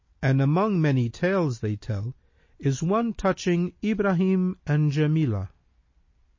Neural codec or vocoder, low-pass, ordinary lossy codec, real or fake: none; 7.2 kHz; MP3, 32 kbps; real